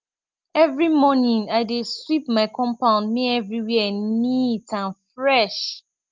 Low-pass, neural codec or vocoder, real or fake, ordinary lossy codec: 7.2 kHz; none; real; Opus, 32 kbps